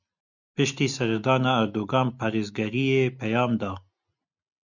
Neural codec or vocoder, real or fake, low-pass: none; real; 7.2 kHz